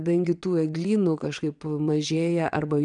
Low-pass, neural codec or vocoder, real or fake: 9.9 kHz; vocoder, 22.05 kHz, 80 mel bands, WaveNeXt; fake